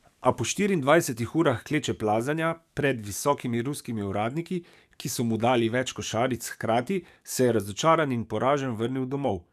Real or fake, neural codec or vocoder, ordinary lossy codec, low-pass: fake; codec, 44.1 kHz, 7.8 kbps, DAC; none; 14.4 kHz